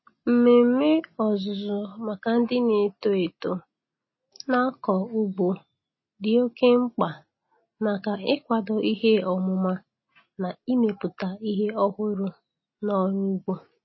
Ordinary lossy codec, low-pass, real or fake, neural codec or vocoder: MP3, 24 kbps; 7.2 kHz; real; none